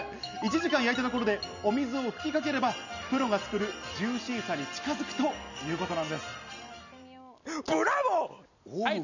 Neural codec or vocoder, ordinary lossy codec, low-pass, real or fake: none; none; 7.2 kHz; real